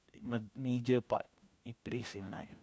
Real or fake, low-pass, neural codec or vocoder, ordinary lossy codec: fake; none; codec, 16 kHz, 1 kbps, FunCodec, trained on LibriTTS, 50 frames a second; none